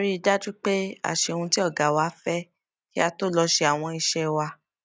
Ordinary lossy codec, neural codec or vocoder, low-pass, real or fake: none; none; none; real